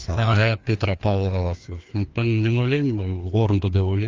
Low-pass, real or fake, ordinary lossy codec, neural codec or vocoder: 7.2 kHz; fake; Opus, 32 kbps; codec, 16 kHz, 2 kbps, FreqCodec, larger model